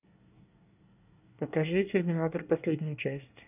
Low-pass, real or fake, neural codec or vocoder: 3.6 kHz; fake; codec, 24 kHz, 1 kbps, SNAC